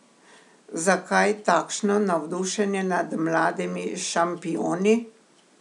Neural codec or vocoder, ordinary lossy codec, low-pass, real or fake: none; none; 10.8 kHz; real